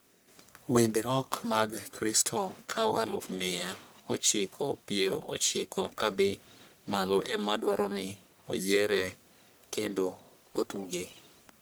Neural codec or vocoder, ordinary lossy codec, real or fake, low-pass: codec, 44.1 kHz, 1.7 kbps, Pupu-Codec; none; fake; none